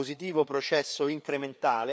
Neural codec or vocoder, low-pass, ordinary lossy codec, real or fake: codec, 16 kHz, 4 kbps, FreqCodec, larger model; none; none; fake